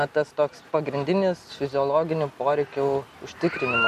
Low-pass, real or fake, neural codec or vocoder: 14.4 kHz; real; none